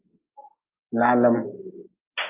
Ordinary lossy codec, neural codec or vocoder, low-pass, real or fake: Opus, 24 kbps; none; 3.6 kHz; real